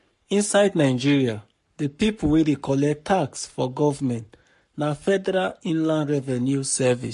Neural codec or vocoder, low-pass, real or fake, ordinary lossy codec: codec, 44.1 kHz, 7.8 kbps, Pupu-Codec; 14.4 kHz; fake; MP3, 48 kbps